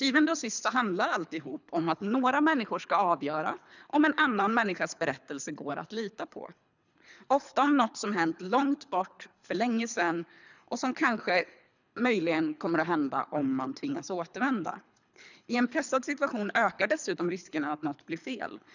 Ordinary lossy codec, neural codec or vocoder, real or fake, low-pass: none; codec, 24 kHz, 3 kbps, HILCodec; fake; 7.2 kHz